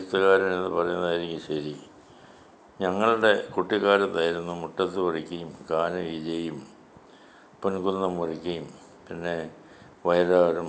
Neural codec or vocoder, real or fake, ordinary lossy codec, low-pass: none; real; none; none